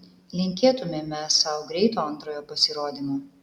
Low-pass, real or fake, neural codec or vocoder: 19.8 kHz; real; none